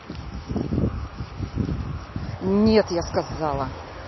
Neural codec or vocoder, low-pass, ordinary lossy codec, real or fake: none; 7.2 kHz; MP3, 24 kbps; real